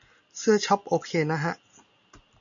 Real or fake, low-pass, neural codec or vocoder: real; 7.2 kHz; none